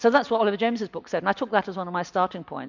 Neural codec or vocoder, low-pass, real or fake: none; 7.2 kHz; real